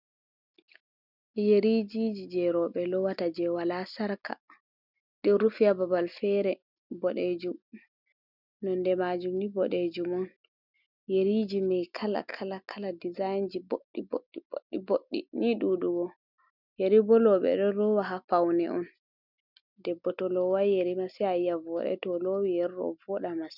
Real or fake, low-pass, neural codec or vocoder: real; 5.4 kHz; none